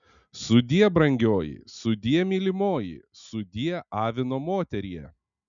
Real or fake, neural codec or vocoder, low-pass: real; none; 7.2 kHz